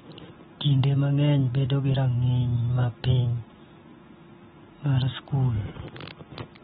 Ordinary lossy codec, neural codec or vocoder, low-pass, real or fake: AAC, 16 kbps; none; 7.2 kHz; real